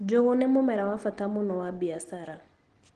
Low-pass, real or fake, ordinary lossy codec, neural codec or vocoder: 9.9 kHz; real; Opus, 16 kbps; none